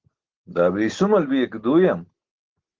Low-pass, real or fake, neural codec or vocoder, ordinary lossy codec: 7.2 kHz; real; none; Opus, 16 kbps